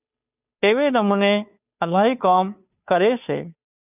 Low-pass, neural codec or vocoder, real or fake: 3.6 kHz; codec, 16 kHz, 2 kbps, FunCodec, trained on Chinese and English, 25 frames a second; fake